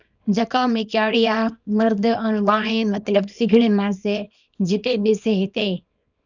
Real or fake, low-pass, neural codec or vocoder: fake; 7.2 kHz; codec, 24 kHz, 0.9 kbps, WavTokenizer, small release